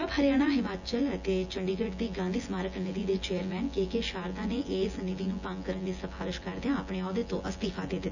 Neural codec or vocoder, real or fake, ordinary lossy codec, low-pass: vocoder, 24 kHz, 100 mel bands, Vocos; fake; none; 7.2 kHz